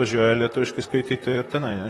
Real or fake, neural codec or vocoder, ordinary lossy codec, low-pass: fake; vocoder, 44.1 kHz, 128 mel bands, Pupu-Vocoder; AAC, 32 kbps; 19.8 kHz